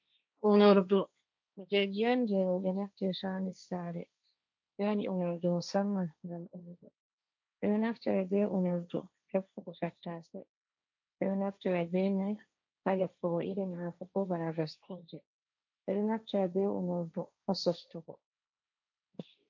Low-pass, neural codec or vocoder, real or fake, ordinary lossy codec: 7.2 kHz; codec, 16 kHz, 1.1 kbps, Voila-Tokenizer; fake; MP3, 48 kbps